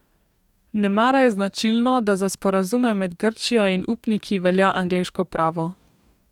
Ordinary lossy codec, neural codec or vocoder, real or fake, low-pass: none; codec, 44.1 kHz, 2.6 kbps, DAC; fake; 19.8 kHz